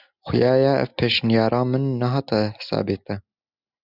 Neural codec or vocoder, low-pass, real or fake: none; 5.4 kHz; real